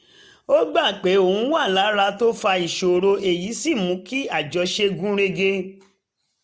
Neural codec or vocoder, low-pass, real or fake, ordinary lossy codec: none; none; real; none